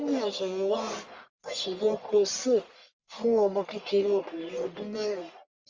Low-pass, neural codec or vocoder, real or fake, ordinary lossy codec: 7.2 kHz; codec, 44.1 kHz, 1.7 kbps, Pupu-Codec; fake; Opus, 32 kbps